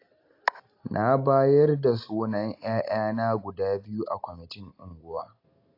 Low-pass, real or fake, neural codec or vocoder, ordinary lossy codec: 5.4 kHz; real; none; AAC, 32 kbps